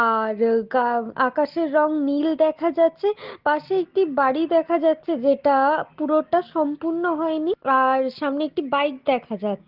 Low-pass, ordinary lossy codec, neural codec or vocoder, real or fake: 5.4 kHz; Opus, 16 kbps; none; real